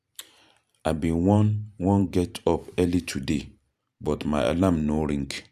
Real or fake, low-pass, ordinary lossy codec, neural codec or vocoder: fake; 14.4 kHz; none; vocoder, 44.1 kHz, 128 mel bands every 512 samples, BigVGAN v2